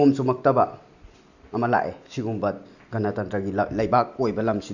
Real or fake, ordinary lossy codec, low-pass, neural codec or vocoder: real; none; 7.2 kHz; none